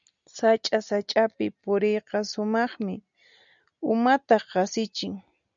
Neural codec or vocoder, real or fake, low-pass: none; real; 7.2 kHz